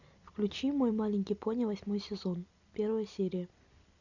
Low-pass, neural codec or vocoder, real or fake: 7.2 kHz; none; real